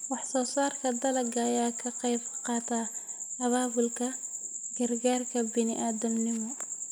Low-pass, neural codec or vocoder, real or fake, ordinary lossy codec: none; none; real; none